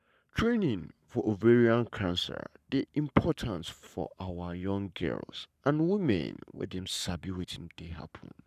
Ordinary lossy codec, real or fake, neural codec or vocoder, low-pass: none; fake; codec, 44.1 kHz, 7.8 kbps, Pupu-Codec; 14.4 kHz